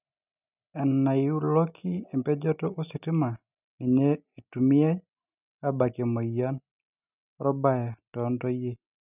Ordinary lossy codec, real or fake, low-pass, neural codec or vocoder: none; real; 3.6 kHz; none